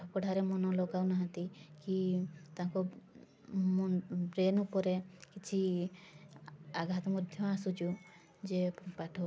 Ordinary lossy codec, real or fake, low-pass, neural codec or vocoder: none; real; none; none